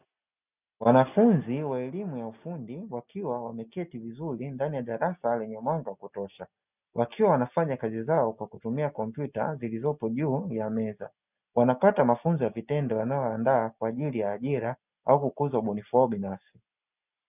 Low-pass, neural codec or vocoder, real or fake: 3.6 kHz; none; real